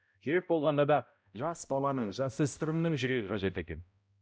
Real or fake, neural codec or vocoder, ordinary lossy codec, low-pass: fake; codec, 16 kHz, 0.5 kbps, X-Codec, HuBERT features, trained on balanced general audio; none; none